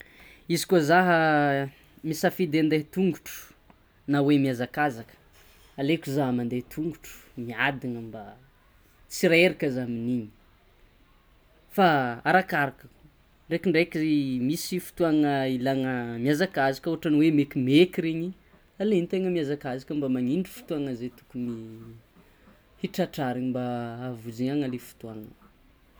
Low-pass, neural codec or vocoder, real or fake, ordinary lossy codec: none; none; real; none